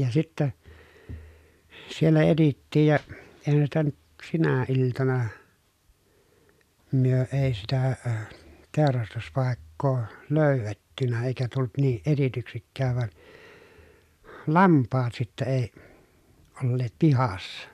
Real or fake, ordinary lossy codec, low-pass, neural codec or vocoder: real; none; 14.4 kHz; none